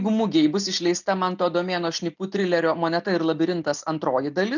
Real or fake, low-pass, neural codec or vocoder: real; 7.2 kHz; none